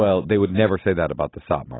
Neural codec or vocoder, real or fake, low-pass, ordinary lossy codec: none; real; 7.2 kHz; AAC, 16 kbps